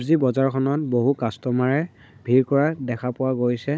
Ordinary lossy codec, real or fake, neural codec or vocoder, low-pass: none; fake; codec, 16 kHz, 16 kbps, FunCodec, trained on Chinese and English, 50 frames a second; none